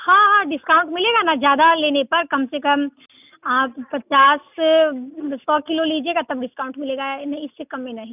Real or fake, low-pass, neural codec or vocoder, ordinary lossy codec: real; 3.6 kHz; none; none